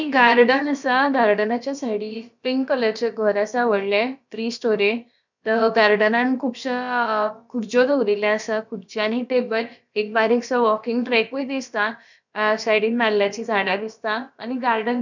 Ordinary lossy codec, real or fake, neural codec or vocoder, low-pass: none; fake; codec, 16 kHz, about 1 kbps, DyCAST, with the encoder's durations; 7.2 kHz